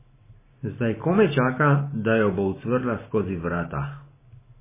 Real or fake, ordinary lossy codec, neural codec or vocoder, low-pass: real; MP3, 16 kbps; none; 3.6 kHz